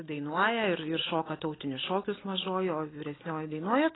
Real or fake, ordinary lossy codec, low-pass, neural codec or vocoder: real; AAC, 16 kbps; 7.2 kHz; none